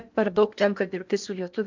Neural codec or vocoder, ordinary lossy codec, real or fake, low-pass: codec, 16 kHz in and 24 kHz out, 0.8 kbps, FocalCodec, streaming, 65536 codes; MP3, 64 kbps; fake; 7.2 kHz